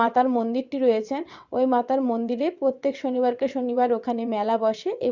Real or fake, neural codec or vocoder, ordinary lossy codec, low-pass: fake; vocoder, 22.05 kHz, 80 mel bands, WaveNeXt; Opus, 64 kbps; 7.2 kHz